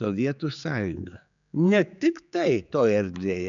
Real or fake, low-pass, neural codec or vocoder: fake; 7.2 kHz; codec, 16 kHz, 4 kbps, X-Codec, HuBERT features, trained on general audio